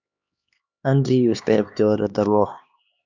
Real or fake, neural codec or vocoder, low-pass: fake; codec, 16 kHz, 2 kbps, X-Codec, HuBERT features, trained on LibriSpeech; 7.2 kHz